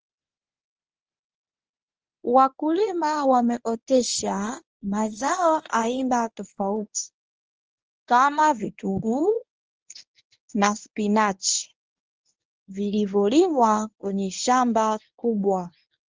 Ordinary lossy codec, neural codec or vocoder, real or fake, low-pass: Opus, 24 kbps; codec, 24 kHz, 0.9 kbps, WavTokenizer, medium speech release version 1; fake; 7.2 kHz